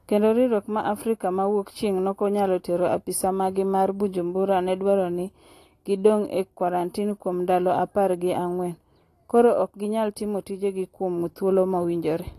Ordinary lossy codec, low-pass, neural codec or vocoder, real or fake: AAC, 48 kbps; 14.4 kHz; none; real